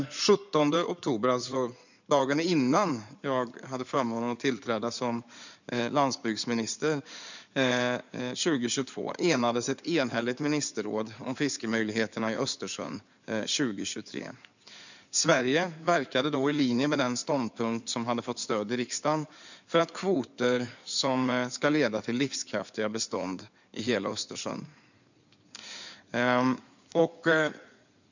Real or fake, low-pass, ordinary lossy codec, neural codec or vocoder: fake; 7.2 kHz; none; codec, 16 kHz in and 24 kHz out, 2.2 kbps, FireRedTTS-2 codec